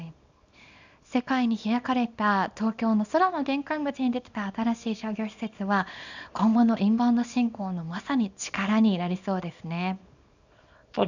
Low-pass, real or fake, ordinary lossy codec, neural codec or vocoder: 7.2 kHz; fake; none; codec, 24 kHz, 0.9 kbps, WavTokenizer, small release